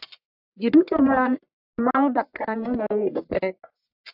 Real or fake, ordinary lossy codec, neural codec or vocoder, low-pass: fake; AAC, 48 kbps; codec, 44.1 kHz, 1.7 kbps, Pupu-Codec; 5.4 kHz